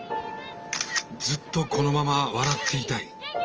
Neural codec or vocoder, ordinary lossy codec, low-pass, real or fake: none; Opus, 24 kbps; 7.2 kHz; real